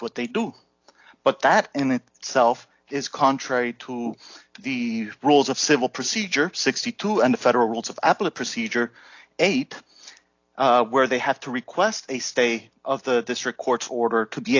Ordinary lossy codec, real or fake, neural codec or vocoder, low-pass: AAC, 48 kbps; real; none; 7.2 kHz